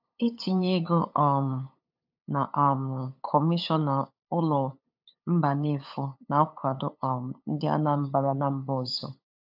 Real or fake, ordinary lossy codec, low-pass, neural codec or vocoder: fake; AAC, 48 kbps; 5.4 kHz; codec, 16 kHz, 8 kbps, FunCodec, trained on LibriTTS, 25 frames a second